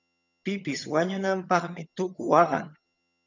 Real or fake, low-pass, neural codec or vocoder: fake; 7.2 kHz; vocoder, 22.05 kHz, 80 mel bands, HiFi-GAN